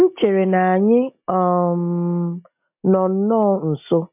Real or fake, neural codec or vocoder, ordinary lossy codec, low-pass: real; none; MP3, 32 kbps; 3.6 kHz